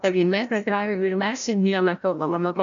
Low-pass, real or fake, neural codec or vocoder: 7.2 kHz; fake; codec, 16 kHz, 0.5 kbps, FreqCodec, larger model